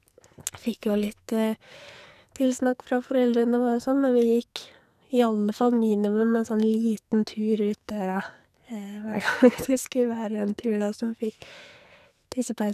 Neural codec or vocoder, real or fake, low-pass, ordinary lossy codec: codec, 44.1 kHz, 2.6 kbps, SNAC; fake; 14.4 kHz; none